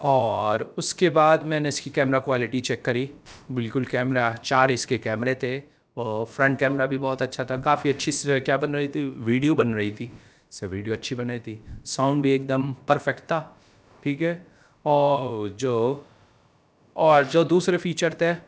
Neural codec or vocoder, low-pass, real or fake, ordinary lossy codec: codec, 16 kHz, about 1 kbps, DyCAST, with the encoder's durations; none; fake; none